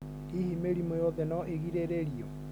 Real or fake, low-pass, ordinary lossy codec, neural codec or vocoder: real; none; none; none